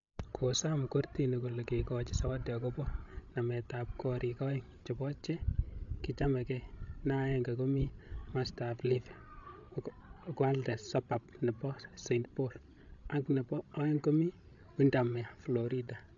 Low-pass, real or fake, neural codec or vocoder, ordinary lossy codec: 7.2 kHz; fake; codec, 16 kHz, 16 kbps, FreqCodec, larger model; AAC, 64 kbps